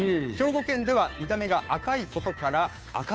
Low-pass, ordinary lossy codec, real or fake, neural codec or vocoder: none; none; fake; codec, 16 kHz, 2 kbps, FunCodec, trained on Chinese and English, 25 frames a second